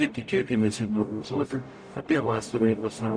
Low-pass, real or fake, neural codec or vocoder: 9.9 kHz; fake; codec, 44.1 kHz, 0.9 kbps, DAC